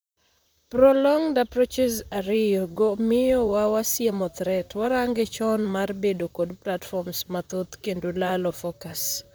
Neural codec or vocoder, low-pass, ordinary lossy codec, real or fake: vocoder, 44.1 kHz, 128 mel bands, Pupu-Vocoder; none; none; fake